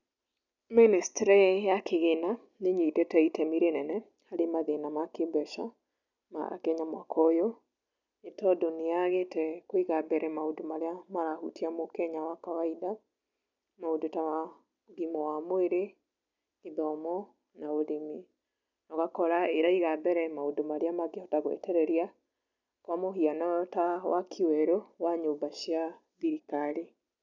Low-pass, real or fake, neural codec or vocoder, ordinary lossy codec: 7.2 kHz; real; none; none